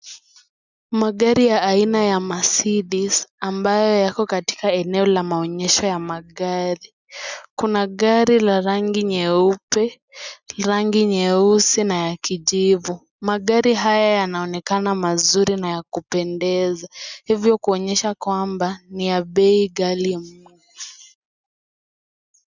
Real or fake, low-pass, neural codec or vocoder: real; 7.2 kHz; none